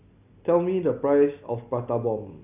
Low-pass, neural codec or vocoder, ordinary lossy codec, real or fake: 3.6 kHz; none; AAC, 32 kbps; real